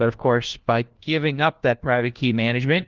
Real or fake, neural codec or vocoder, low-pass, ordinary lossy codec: fake; codec, 16 kHz, 1 kbps, FunCodec, trained on LibriTTS, 50 frames a second; 7.2 kHz; Opus, 16 kbps